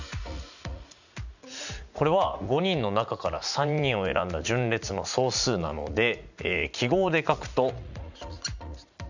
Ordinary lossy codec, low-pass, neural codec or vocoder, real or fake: none; 7.2 kHz; vocoder, 44.1 kHz, 80 mel bands, Vocos; fake